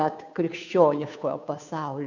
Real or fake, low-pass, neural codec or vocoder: fake; 7.2 kHz; codec, 16 kHz, 2 kbps, FunCodec, trained on Chinese and English, 25 frames a second